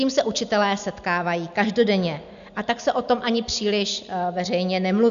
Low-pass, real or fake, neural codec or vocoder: 7.2 kHz; real; none